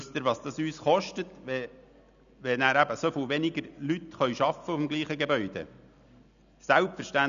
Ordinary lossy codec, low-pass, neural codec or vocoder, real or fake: none; 7.2 kHz; none; real